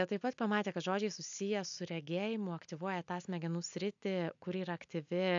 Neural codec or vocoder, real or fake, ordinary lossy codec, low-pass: none; real; AAC, 64 kbps; 7.2 kHz